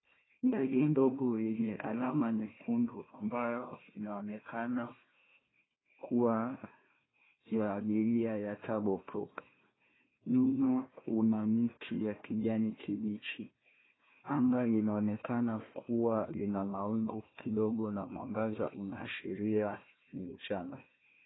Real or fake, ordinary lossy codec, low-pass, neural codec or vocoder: fake; AAC, 16 kbps; 7.2 kHz; codec, 16 kHz, 1 kbps, FunCodec, trained on Chinese and English, 50 frames a second